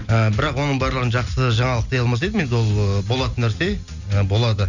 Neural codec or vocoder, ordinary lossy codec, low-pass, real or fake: none; none; 7.2 kHz; real